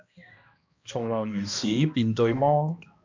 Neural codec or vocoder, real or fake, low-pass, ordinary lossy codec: codec, 16 kHz, 1 kbps, X-Codec, HuBERT features, trained on balanced general audio; fake; 7.2 kHz; MP3, 64 kbps